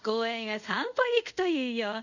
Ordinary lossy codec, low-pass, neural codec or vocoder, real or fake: none; 7.2 kHz; codec, 24 kHz, 0.5 kbps, DualCodec; fake